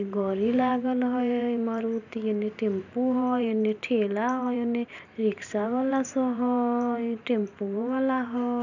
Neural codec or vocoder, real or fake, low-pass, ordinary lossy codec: vocoder, 44.1 kHz, 128 mel bands every 512 samples, BigVGAN v2; fake; 7.2 kHz; none